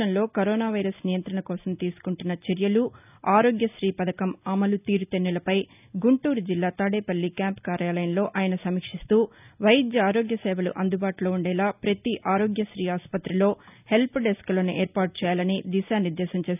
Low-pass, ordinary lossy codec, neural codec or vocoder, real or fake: 3.6 kHz; none; none; real